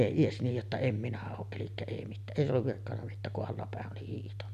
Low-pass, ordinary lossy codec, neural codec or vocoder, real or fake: 14.4 kHz; none; none; real